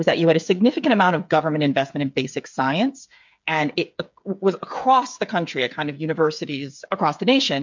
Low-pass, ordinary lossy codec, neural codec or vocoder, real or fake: 7.2 kHz; MP3, 64 kbps; codec, 16 kHz, 8 kbps, FreqCodec, smaller model; fake